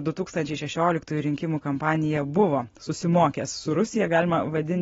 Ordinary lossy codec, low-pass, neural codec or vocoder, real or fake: AAC, 24 kbps; 7.2 kHz; none; real